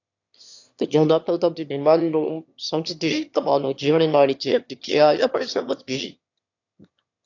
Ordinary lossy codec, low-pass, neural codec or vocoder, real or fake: AAC, 48 kbps; 7.2 kHz; autoencoder, 22.05 kHz, a latent of 192 numbers a frame, VITS, trained on one speaker; fake